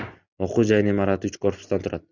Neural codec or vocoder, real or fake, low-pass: none; real; 7.2 kHz